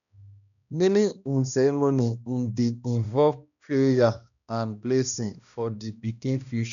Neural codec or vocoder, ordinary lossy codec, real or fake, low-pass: codec, 16 kHz, 1 kbps, X-Codec, HuBERT features, trained on balanced general audio; none; fake; 7.2 kHz